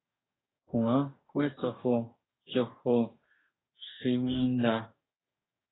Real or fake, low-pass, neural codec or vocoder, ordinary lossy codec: fake; 7.2 kHz; codec, 44.1 kHz, 2.6 kbps, DAC; AAC, 16 kbps